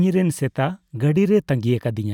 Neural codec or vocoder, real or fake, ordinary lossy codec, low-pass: none; real; none; 19.8 kHz